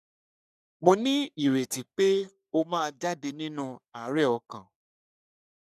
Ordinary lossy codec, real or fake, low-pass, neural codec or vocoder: none; fake; 14.4 kHz; codec, 44.1 kHz, 3.4 kbps, Pupu-Codec